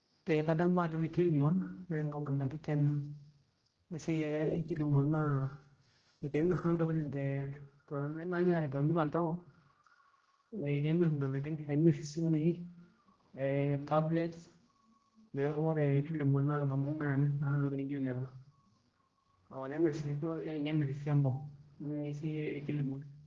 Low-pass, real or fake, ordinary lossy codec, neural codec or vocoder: 7.2 kHz; fake; Opus, 16 kbps; codec, 16 kHz, 0.5 kbps, X-Codec, HuBERT features, trained on general audio